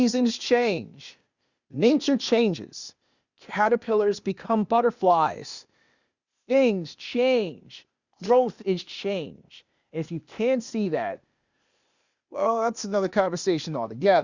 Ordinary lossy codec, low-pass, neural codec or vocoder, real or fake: Opus, 64 kbps; 7.2 kHz; codec, 16 kHz, 0.8 kbps, ZipCodec; fake